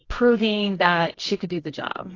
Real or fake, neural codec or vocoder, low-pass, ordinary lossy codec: fake; codec, 24 kHz, 0.9 kbps, WavTokenizer, medium music audio release; 7.2 kHz; AAC, 32 kbps